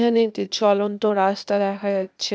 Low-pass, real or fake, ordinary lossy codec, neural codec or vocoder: none; fake; none; codec, 16 kHz, 0.8 kbps, ZipCodec